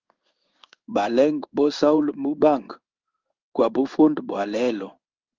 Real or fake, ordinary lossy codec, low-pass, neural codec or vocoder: fake; Opus, 32 kbps; 7.2 kHz; codec, 16 kHz in and 24 kHz out, 1 kbps, XY-Tokenizer